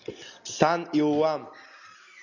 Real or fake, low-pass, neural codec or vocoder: real; 7.2 kHz; none